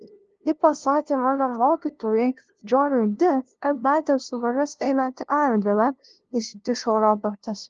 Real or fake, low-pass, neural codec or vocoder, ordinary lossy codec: fake; 7.2 kHz; codec, 16 kHz, 0.5 kbps, FunCodec, trained on LibriTTS, 25 frames a second; Opus, 16 kbps